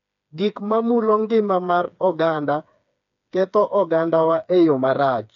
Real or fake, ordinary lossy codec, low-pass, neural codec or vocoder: fake; none; 7.2 kHz; codec, 16 kHz, 4 kbps, FreqCodec, smaller model